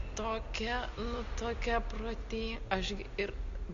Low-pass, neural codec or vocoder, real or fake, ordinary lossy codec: 7.2 kHz; none; real; MP3, 48 kbps